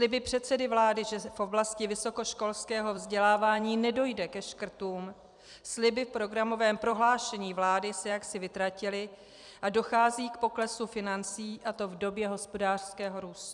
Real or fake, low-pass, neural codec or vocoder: fake; 10.8 kHz; vocoder, 44.1 kHz, 128 mel bands every 256 samples, BigVGAN v2